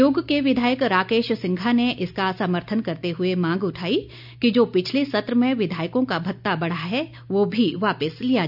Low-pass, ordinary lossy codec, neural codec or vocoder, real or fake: 5.4 kHz; none; none; real